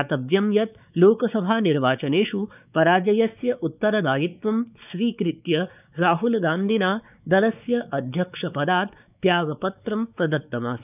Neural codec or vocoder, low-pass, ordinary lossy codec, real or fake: codec, 16 kHz, 4 kbps, X-Codec, WavLM features, trained on Multilingual LibriSpeech; 3.6 kHz; none; fake